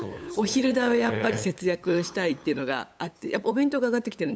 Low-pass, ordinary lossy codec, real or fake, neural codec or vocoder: none; none; fake; codec, 16 kHz, 8 kbps, FunCodec, trained on LibriTTS, 25 frames a second